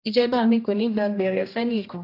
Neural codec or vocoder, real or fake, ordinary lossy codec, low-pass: codec, 16 kHz, 1 kbps, X-Codec, HuBERT features, trained on general audio; fake; AAC, 32 kbps; 5.4 kHz